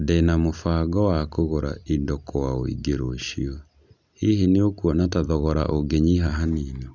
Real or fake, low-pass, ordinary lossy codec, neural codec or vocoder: real; 7.2 kHz; none; none